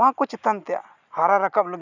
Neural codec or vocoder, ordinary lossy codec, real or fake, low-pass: none; none; real; 7.2 kHz